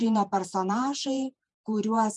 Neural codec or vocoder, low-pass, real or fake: vocoder, 48 kHz, 128 mel bands, Vocos; 10.8 kHz; fake